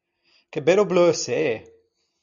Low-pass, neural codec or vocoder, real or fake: 7.2 kHz; none; real